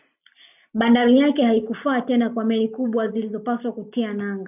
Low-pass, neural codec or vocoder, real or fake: 3.6 kHz; none; real